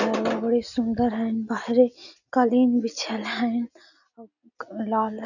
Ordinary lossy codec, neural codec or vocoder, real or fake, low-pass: none; none; real; 7.2 kHz